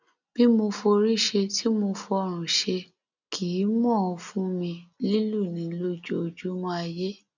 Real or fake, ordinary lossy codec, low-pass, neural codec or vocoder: real; none; 7.2 kHz; none